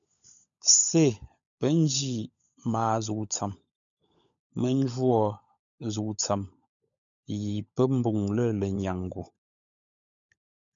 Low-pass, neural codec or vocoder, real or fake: 7.2 kHz; codec, 16 kHz, 16 kbps, FunCodec, trained on LibriTTS, 50 frames a second; fake